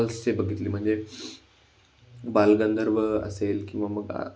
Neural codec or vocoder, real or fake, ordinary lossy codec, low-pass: none; real; none; none